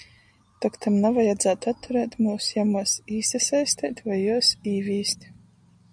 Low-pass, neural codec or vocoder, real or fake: 9.9 kHz; none; real